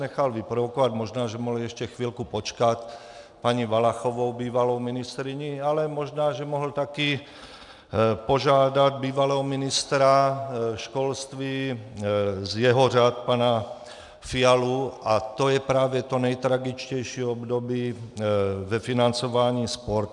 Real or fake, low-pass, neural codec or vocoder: real; 10.8 kHz; none